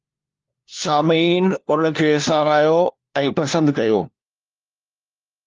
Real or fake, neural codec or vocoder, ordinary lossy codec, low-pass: fake; codec, 16 kHz, 1 kbps, FunCodec, trained on LibriTTS, 50 frames a second; Opus, 32 kbps; 7.2 kHz